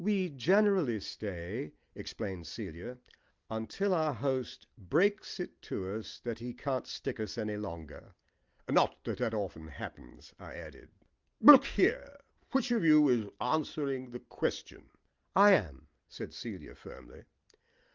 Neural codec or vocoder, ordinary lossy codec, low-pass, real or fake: none; Opus, 32 kbps; 7.2 kHz; real